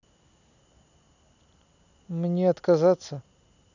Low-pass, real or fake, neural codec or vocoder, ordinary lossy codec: 7.2 kHz; real; none; none